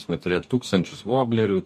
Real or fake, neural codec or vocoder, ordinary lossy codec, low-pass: fake; codec, 44.1 kHz, 2.6 kbps, DAC; MP3, 64 kbps; 14.4 kHz